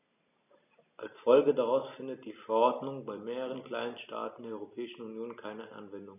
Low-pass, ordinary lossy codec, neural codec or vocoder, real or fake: 3.6 kHz; none; none; real